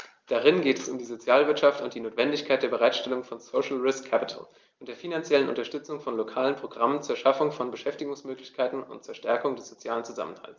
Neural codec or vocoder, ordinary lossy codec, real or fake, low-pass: none; Opus, 24 kbps; real; 7.2 kHz